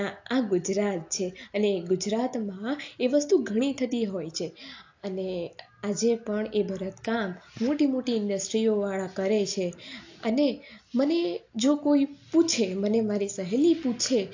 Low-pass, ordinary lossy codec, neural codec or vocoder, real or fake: 7.2 kHz; AAC, 48 kbps; none; real